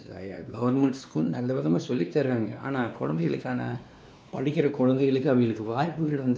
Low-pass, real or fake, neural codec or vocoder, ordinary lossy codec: none; fake; codec, 16 kHz, 2 kbps, X-Codec, WavLM features, trained on Multilingual LibriSpeech; none